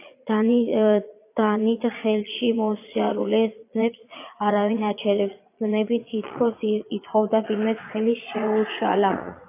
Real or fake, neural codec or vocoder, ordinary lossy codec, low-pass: fake; vocoder, 22.05 kHz, 80 mel bands, Vocos; AAC, 24 kbps; 3.6 kHz